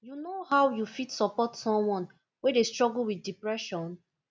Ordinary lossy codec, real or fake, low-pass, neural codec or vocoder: none; real; none; none